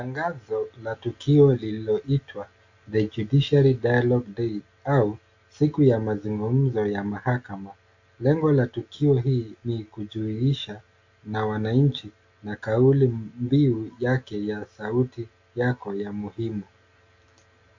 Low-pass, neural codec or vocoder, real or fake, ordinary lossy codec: 7.2 kHz; none; real; AAC, 48 kbps